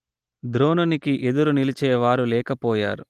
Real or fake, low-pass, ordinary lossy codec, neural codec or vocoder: real; 14.4 kHz; Opus, 24 kbps; none